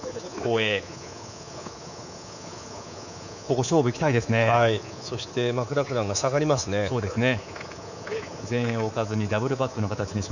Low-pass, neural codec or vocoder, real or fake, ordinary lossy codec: 7.2 kHz; codec, 24 kHz, 3.1 kbps, DualCodec; fake; none